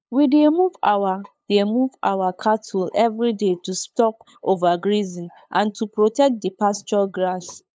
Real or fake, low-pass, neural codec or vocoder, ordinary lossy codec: fake; none; codec, 16 kHz, 8 kbps, FunCodec, trained on LibriTTS, 25 frames a second; none